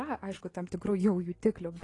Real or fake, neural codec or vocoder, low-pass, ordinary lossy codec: real; none; 10.8 kHz; AAC, 32 kbps